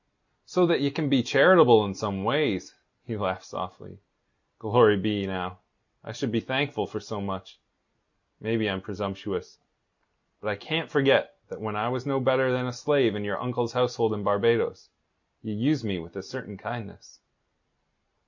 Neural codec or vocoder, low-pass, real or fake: none; 7.2 kHz; real